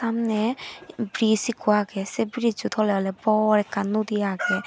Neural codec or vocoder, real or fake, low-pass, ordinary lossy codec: none; real; none; none